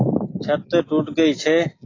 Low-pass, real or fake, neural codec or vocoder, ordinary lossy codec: 7.2 kHz; real; none; AAC, 32 kbps